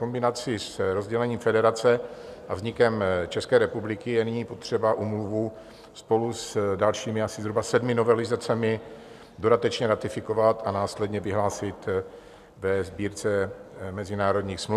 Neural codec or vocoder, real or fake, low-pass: none; real; 14.4 kHz